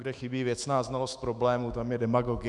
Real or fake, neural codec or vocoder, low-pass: fake; autoencoder, 48 kHz, 128 numbers a frame, DAC-VAE, trained on Japanese speech; 10.8 kHz